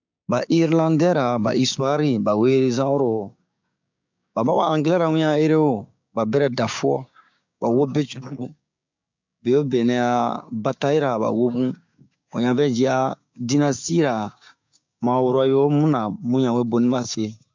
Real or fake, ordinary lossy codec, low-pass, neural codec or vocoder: fake; MP3, 64 kbps; 7.2 kHz; codec, 16 kHz, 6 kbps, DAC